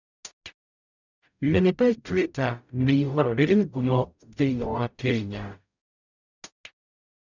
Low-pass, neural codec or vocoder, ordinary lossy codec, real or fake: 7.2 kHz; codec, 44.1 kHz, 0.9 kbps, DAC; none; fake